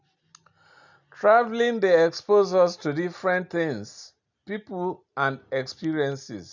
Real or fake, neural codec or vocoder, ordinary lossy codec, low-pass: real; none; none; 7.2 kHz